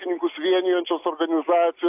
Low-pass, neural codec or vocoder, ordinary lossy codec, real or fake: 3.6 kHz; none; AAC, 32 kbps; real